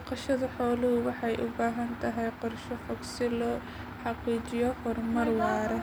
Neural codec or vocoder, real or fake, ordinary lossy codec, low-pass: none; real; none; none